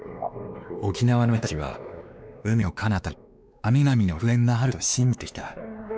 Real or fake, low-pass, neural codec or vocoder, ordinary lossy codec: fake; none; codec, 16 kHz, 2 kbps, X-Codec, WavLM features, trained on Multilingual LibriSpeech; none